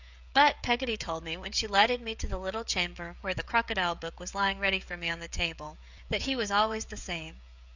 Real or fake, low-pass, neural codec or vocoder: fake; 7.2 kHz; codec, 16 kHz, 16 kbps, FreqCodec, smaller model